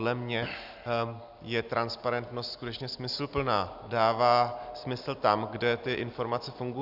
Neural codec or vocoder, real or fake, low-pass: none; real; 5.4 kHz